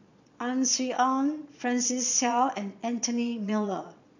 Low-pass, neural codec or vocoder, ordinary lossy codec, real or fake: 7.2 kHz; vocoder, 22.05 kHz, 80 mel bands, Vocos; none; fake